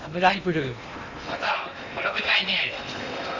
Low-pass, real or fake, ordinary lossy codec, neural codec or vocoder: 7.2 kHz; fake; none; codec, 16 kHz in and 24 kHz out, 0.8 kbps, FocalCodec, streaming, 65536 codes